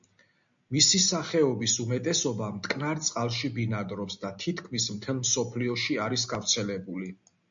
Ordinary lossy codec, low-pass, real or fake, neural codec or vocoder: MP3, 64 kbps; 7.2 kHz; real; none